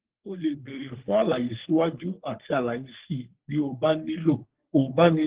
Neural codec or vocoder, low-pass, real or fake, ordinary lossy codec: codec, 44.1 kHz, 3.4 kbps, Pupu-Codec; 3.6 kHz; fake; Opus, 16 kbps